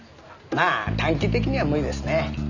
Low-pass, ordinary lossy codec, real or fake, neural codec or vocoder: 7.2 kHz; none; real; none